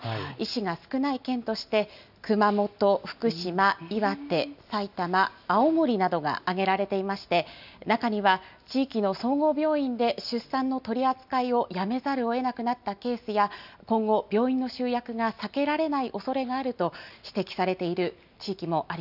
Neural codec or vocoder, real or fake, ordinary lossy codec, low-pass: none; real; none; 5.4 kHz